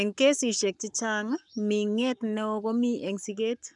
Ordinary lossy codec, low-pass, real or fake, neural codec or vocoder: none; 10.8 kHz; fake; codec, 44.1 kHz, 7.8 kbps, Pupu-Codec